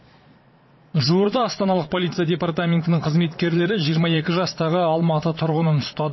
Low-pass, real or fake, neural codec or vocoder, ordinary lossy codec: 7.2 kHz; fake; vocoder, 22.05 kHz, 80 mel bands, Vocos; MP3, 24 kbps